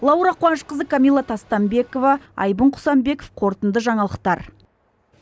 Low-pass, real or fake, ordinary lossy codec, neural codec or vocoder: none; real; none; none